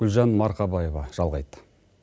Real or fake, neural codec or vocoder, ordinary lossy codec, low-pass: real; none; none; none